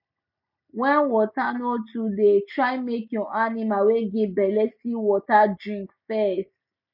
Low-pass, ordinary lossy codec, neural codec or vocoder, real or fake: 5.4 kHz; none; none; real